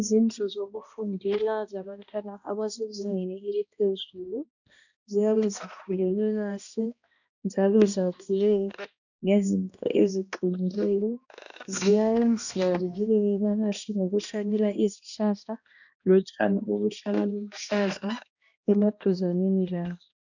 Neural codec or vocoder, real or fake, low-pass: codec, 16 kHz, 1 kbps, X-Codec, HuBERT features, trained on balanced general audio; fake; 7.2 kHz